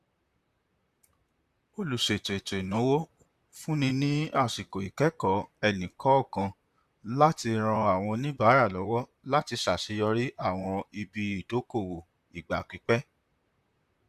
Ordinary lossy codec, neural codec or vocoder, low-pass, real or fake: Opus, 64 kbps; vocoder, 44.1 kHz, 128 mel bands, Pupu-Vocoder; 14.4 kHz; fake